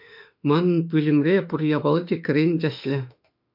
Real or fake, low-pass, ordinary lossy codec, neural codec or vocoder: fake; 5.4 kHz; MP3, 48 kbps; autoencoder, 48 kHz, 32 numbers a frame, DAC-VAE, trained on Japanese speech